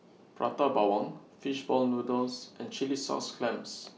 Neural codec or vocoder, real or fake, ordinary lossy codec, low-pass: none; real; none; none